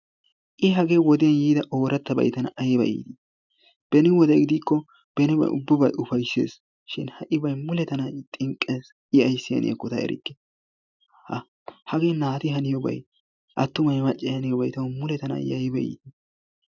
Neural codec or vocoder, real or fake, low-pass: none; real; 7.2 kHz